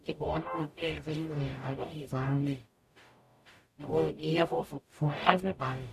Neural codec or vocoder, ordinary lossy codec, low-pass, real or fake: codec, 44.1 kHz, 0.9 kbps, DAC; MP3, 64 kbps; 14.4 kHz; fake